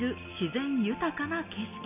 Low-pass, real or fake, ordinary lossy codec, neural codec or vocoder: 3.6 kHz; real; none; none